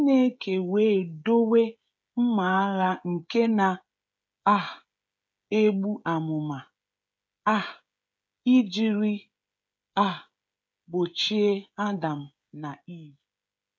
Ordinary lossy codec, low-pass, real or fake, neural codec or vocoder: none; none; fake; codec, 16 kHz, 16 kbps, FreqCodec, smaller model